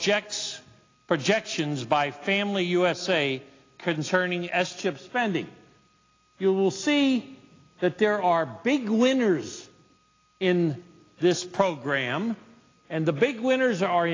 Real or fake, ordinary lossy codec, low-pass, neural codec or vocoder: real; AAC, 32 kbps; 7.2 kHz; none